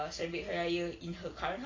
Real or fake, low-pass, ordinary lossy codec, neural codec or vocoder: real; 7.2 kHz; AAC, 32 kbps; none